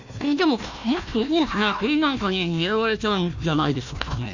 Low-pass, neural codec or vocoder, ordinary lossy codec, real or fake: 7.2 kHz; codec, 16 kHz, 1 kbps, FunCodec, trained on Chinese and English, 50 frames a second; none; fake